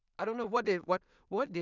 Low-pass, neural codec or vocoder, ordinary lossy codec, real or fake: 7.2 kHz; codec, 16 kHz in and 24 kHz out, 0.4 kbps, LongCat-Audio-Codec, two codebook decoder; none; fake